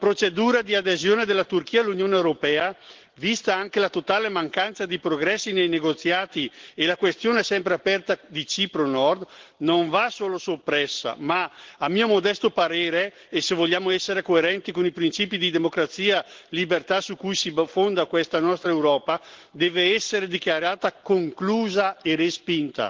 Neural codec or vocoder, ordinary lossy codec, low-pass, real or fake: none; Opus, 16 kbps; 7.2 kHz; real